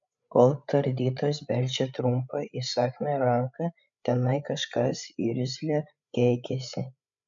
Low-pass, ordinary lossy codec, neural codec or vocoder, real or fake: 7.2 kHz; MP3, 64 kbps; codec, 16 kHz, 8 kbps, FreqCodec, larger model; fake